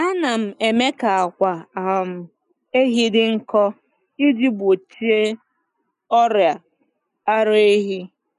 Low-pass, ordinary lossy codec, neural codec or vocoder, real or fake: 10.8 kHz; none; none; real